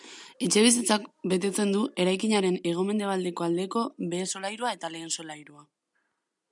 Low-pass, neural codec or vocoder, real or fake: 10.8 kHz; none; real